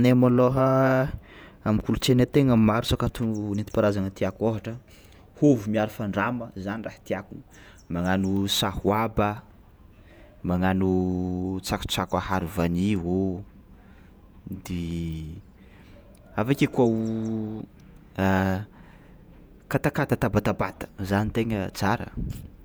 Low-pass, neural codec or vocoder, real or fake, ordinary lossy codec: none; vocoder, 48 kHz, 128 mel bands, Vocos; fake; none